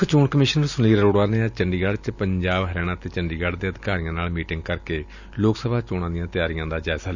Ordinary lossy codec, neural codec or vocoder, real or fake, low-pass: none; none; real; 7.2 kHz